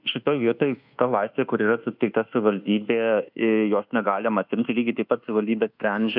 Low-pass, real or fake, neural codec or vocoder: 5.4 kHz; fake; codec, 24 kHz, 1.2 kbps, DualCodec